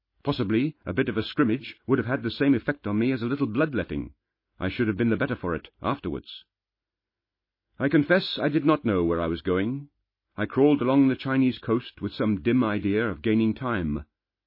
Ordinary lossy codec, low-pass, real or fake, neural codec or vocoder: MP3, 24 kbps; 5.4 kHz; real; none